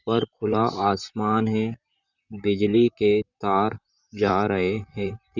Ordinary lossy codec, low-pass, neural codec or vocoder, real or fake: none; 7.2 kHz; vocoder, 44.1 kHz, 128 mel bands, Pupu-Vocoder; fake